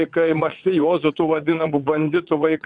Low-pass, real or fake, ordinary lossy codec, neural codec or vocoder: 9.9 kHz; fake; MP3, 96 kbps; vocoder, 22.05 kHz, 80 mel bands, WaveNeXt